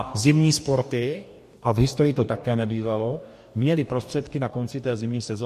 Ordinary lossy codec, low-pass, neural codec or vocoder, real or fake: MP3, 64 kbps; 14.4 kHz; codec, 44.1 kHz, 2.6 kbps, DAC; fake